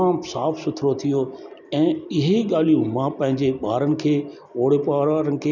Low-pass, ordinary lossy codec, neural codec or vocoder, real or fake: 7.2 kHz; none; none; real